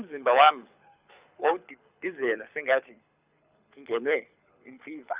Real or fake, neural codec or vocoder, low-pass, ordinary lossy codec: fake; codec, 24 kHz, 6 kbps, HILCodec; 3.6 kHz; Opus, 64 kbps